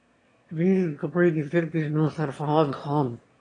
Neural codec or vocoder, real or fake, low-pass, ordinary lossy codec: autoencoder, 22.05 kHz, a latent of 192 numbers a frame, VITS, trained on one speaker; fake; 9.9 kHz; AAC, 32 kbps